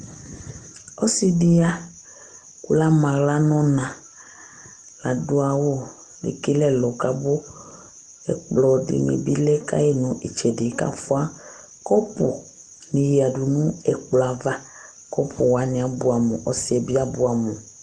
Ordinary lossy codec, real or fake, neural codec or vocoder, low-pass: Opus, 24 kbps; real; none; 9.9 kHz